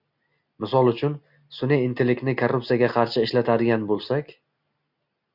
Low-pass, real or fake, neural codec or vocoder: 5.4 kHz; real; none